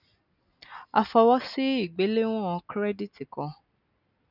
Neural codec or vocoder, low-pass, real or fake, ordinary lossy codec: none; 5.4 kHz; real; none